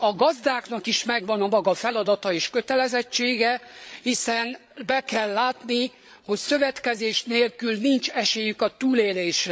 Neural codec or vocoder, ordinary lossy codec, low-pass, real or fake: codec, 16 kHz, 8 kbps, FreqCodec, larger model; none; none; fake